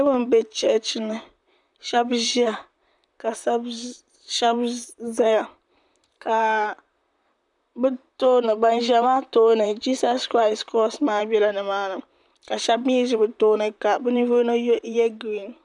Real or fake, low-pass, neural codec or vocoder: fake; 10.8 kHz; vocoder, 44.1 kHz, 128 mel bands, Pupu-Vocoder